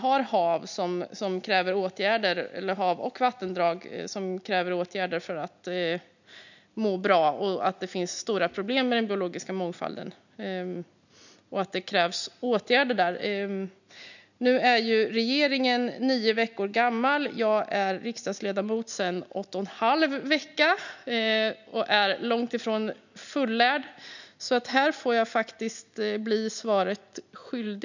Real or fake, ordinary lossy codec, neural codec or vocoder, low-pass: real; none; none; 7.2 kHz